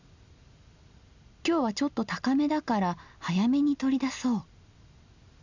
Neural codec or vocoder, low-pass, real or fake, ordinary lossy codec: none; 7.2 kHz; real; none